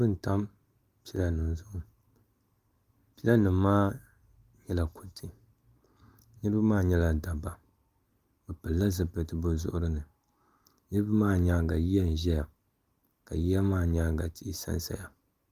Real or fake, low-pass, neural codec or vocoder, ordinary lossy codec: real; 14.4 kHz; none; Opus, 32 kbps